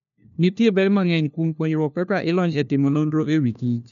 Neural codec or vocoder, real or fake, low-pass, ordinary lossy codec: codec, 16 kHz, 1 kbps, FunCodec, trained on LibriTTS, 50 frames a second; fake; 7.2 kHz; none